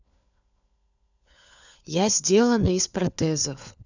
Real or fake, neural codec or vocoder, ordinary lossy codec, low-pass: fake; codec, 16 kHz, 4 kbps, FunCodec, trained on LibriTTS, 50 frames a second; none; 7.2 kHz